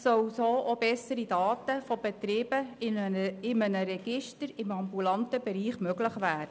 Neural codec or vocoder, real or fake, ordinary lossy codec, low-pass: none; real; none; none